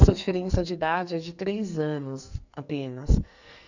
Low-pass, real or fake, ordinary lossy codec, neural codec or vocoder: 7.2 kHz; fake; none; codec, 44.1 kHz, 2.6 kbps, SNAC